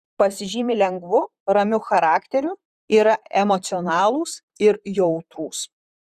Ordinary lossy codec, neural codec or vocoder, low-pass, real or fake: Opus, 64 kbps; vocoder, 44.1 kHz, 128 mel bands, Pupu-Vocoder; 14.4 kHz; fake